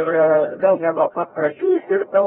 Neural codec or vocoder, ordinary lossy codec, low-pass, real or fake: codec, 16 kHz, 0.5 kbps, FreqCodec, larger model; AAC, 16 kbps; 7.2 kHz; fake